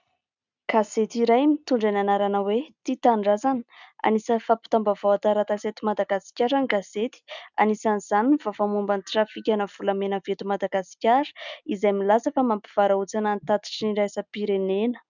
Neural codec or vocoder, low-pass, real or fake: none; 7.2 kHz; real